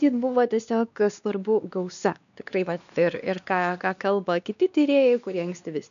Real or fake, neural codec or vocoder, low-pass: fake; codec, 16 kHz, 2 kbps, X-Codec, WavLM features, trained on Multilingual LibriSpeech; 7.2 kHz